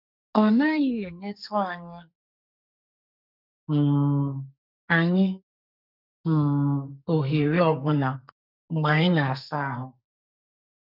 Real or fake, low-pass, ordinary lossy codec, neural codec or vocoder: fake; 5.4 kHz; none; codec, 44.1 kHz, 2.6 kbps, SNAC